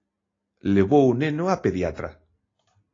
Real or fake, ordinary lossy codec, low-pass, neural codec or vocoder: real; AAC, 32 kbps; 7.2 kHz; none